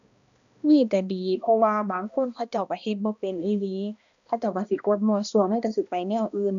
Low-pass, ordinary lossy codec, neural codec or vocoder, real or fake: 7.2 kHz; none; codec, 16 kHz, 1 kbps, X-Codec, HuBERT features, trained on balanced general audio; fake